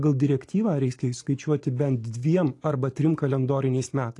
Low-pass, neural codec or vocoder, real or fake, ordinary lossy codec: 10.8 kHz; none; real; AAC, 48 kbps